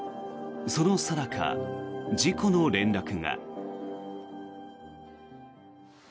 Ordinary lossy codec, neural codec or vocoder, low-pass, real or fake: none; none; none; real